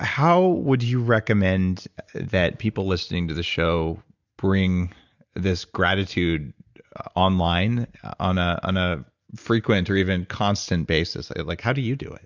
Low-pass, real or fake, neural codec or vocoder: 7.2 kHz; real; none